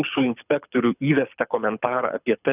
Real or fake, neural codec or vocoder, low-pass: fake; codec, 24 kHz, 6 kbps, HILCodec; 3.6 kHz